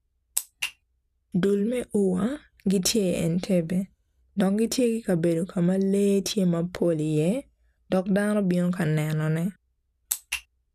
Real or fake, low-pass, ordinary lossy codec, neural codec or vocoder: real; 14.4 kHz; none; none